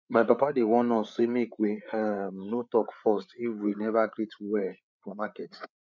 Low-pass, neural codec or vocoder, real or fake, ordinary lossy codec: 7.2 kHz; codec, 16 kHz, 8 kbps, FreqCodec, larger model; fake; none